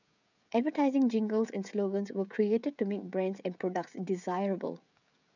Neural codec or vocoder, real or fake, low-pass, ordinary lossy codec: codec, 16 kHz, 16 kbps, FreqCodec, smaller model; fake; 7.2 kHz; none